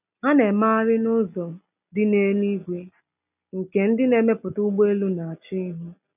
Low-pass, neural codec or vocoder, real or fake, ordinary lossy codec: 3.6 kHz; none; real; none